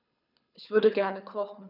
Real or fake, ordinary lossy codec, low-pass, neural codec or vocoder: fake; none; 5.4 kHz; codec, 24 kHz, 6 kbps, HILCodec